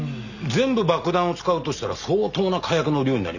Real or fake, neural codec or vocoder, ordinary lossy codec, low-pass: real; none; none; 7.2 kHz